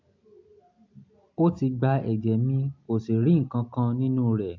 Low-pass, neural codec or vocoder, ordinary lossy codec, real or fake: 7.2 kHz; none; MP3, 48 kbps; real